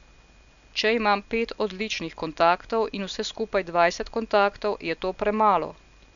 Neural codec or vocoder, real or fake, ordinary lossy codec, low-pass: none; real; none; 7.2 kHz